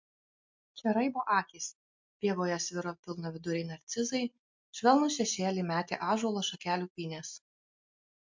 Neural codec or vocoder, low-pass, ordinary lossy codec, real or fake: none; 7.2 kHz; MP3, 64 kbps; real